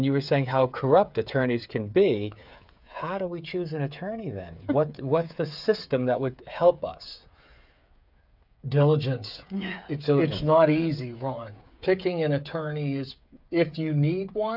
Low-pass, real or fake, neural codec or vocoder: 5.4 kHz; fake; codec, 16 kHz, 8 kbps, FreqCodec, smaller model